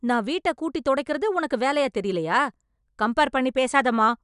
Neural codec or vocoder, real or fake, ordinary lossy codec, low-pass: none; real; none; 10.8 kHz